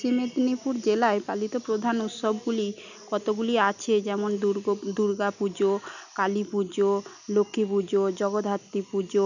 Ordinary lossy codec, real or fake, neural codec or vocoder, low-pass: none; real; none; 7.2 kHz